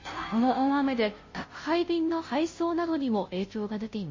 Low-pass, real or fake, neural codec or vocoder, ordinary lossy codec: 7.2 kHz; fake; codec, 16 kHz, 0.5 kbps, FunCodec, trained on Chinese and English, 25 frames a second; MP3, 32 kbps